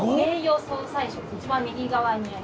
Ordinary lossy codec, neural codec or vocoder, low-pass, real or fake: none; none; none; real